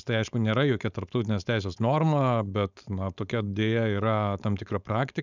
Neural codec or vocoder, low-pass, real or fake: codec, 16 kHz, 4.8 kbps, FACodec; 7.2 kHz; fake